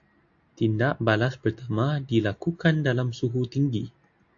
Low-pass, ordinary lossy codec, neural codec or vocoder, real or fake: 7.2 kHz; AAC, 48 kbps; none; real